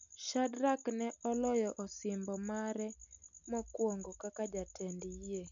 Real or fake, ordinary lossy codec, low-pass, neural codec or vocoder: real; none; 7.2 kHz; none